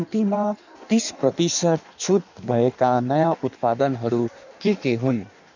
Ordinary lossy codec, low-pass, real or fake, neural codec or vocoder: none; 7.2 kHz; fake; codec, 16 kHz in and 24 kHz out, 1.1 kbps, FireRedTTS-2 codec